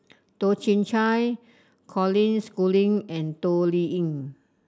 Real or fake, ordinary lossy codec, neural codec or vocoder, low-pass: real; none; none; none